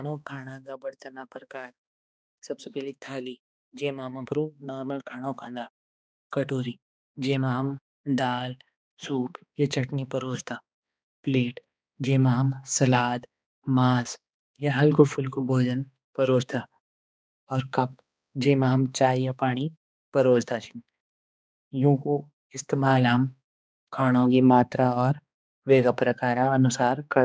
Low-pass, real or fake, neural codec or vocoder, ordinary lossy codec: none; fake; codec, 16 kHz, 2 kbps, X-Codec, HuBERT features, trained on balanced general audio; none